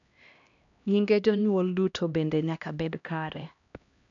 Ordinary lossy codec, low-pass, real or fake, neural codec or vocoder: none; 7.2 kHz; fake; codec, 16 kHz, 1 kbps, X-Codec, HuBERT features, trained on LibriSpeech